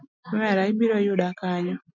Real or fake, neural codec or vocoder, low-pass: real; none; 7.2 kHz